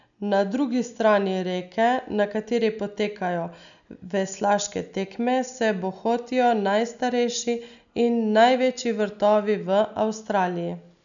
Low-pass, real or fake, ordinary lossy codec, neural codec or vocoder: 7.2 kHz; real; none; none